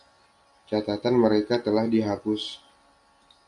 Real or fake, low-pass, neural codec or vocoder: real; 10.8 kHz; none